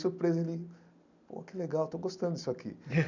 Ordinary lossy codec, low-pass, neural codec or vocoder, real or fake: none; 7.2 kHz; none; real